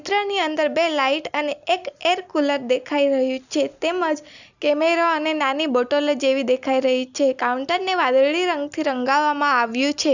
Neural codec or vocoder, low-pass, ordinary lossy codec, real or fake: none; 7.2 kHz; none; real